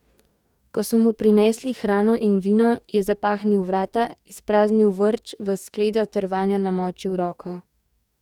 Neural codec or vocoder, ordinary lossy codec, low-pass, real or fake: codec, 44.1 kHz, 2.6 kbps, DAC; none; 19.8 kHz; fake